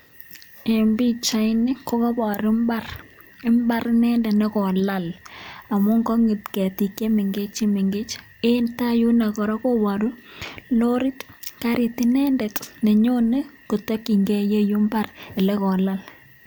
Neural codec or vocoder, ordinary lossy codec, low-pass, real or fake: none; none; none; real